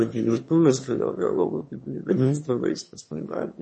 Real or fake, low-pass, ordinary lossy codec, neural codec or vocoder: fake; 9.9 kHz; MP3, 32 kbps; autoencoder, 22.05 kHz, a latent of 192 numbers a frame, VITS, trained on one speaker